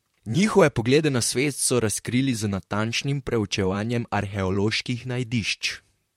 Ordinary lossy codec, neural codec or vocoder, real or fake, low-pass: MP3, 64 kbps; vocoder, 44.1 kHz, 128 mel bands, Pupu-Vocoder; fake; 19.8 kHz